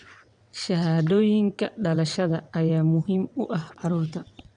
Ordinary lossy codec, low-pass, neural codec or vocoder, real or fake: none; 9.9 kHz; vocoder, 22.05 kHz, 80 mel bands, WaveNeXt; fake